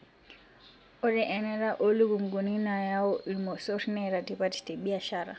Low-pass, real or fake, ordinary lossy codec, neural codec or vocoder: none; real; none; none